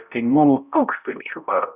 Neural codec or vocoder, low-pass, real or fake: codec, 16 kHz, 0.5 kbps, X-Codec, HuBERT features, trained on balanced general audio; 3.6 kHz; fake